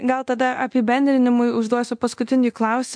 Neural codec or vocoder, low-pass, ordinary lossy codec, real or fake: codec, 24 kHz, 0.9 kbps, DualCodec; 9.9 kHz; MP3, 64 kbps; fake